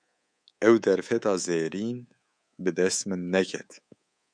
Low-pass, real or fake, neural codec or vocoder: 9.9 kHz; fake; codec, 24 kHz, 3.1 kbps, DualCodec